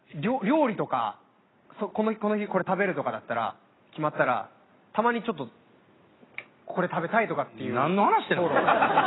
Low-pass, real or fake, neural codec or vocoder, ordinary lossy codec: 7.2 kHz; real; none; AAC, 16 kbps